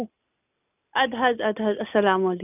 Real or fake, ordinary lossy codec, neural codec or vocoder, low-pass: real; none; none; 3.6 kHz